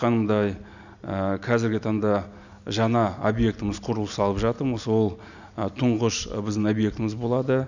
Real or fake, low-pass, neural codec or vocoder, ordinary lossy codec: real; 7.2 kHz; none; none